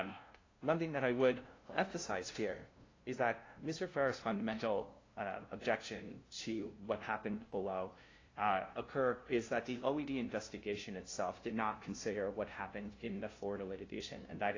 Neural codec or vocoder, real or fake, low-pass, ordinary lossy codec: codec, 16 kHz, 0.5 kbps, FunCodec, trained on LibriTTS, 25 frames a second; fake; 7.2 kHz; AAC, 32 kbps